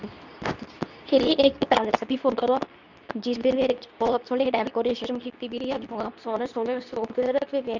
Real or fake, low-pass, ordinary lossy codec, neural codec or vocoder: fake; 7.2 kHz; none; codec, 24 kHz, 0.9 kbps, WavTokenizer, medium speech release version 2